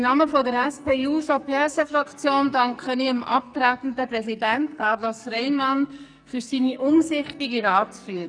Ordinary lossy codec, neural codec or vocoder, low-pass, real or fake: none; codec, 44.1 kHz, 2.6 kbps, SNAC; 9.9 kHz; fake